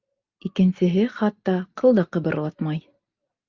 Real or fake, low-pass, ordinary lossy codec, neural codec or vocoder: real; 7.2 kHz; Opus, 24 kbps; none